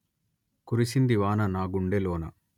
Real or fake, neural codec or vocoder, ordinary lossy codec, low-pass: real; none; none; 19.8 kHz